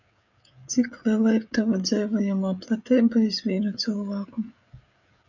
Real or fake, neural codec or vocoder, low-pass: fake; codec, 16 kHz, 16 kbps, FreqCodec, smaller model; 7.2 kHz